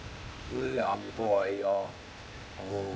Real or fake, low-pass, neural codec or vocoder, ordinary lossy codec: fake; none; codec, 16 kHz, 0.8 kbps, ZipCodec; none